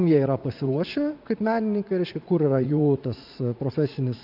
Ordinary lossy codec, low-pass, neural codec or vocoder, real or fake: AAC, 48 kbps; 5.4 kHz; vocoder, 44.1 kHz, 80 mel bands, Vocos; fake